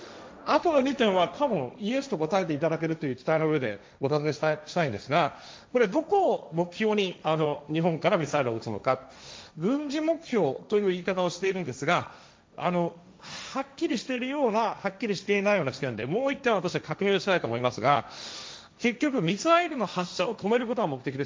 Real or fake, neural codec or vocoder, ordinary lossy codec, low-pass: fake; codec, 16 kHz, 1.1 kbps, Voila-Tokenizer; none; none